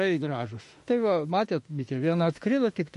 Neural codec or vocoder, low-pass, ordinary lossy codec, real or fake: autoencoder, 48 kHz, 32 numbers a frame, DAC-VAE, trained on Japanese speech; 14.4 kHz; MP3, 48 kbps; fake